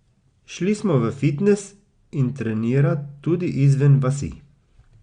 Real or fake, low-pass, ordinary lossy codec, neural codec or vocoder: real; 9.9 kHz; Opus, 64 kbps; none